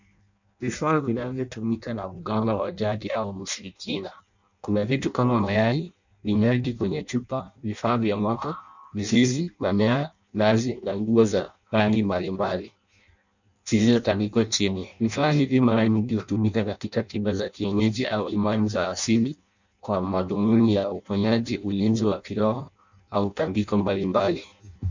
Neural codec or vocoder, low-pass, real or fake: codec, 16 kHz in and 24 kHz out, 0.6 kbps, FireRedTTS-2 codec; 7.2 kHz; fake